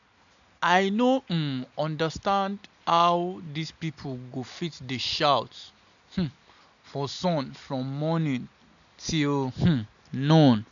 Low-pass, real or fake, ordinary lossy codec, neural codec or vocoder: 7.2 kHz; real; none; none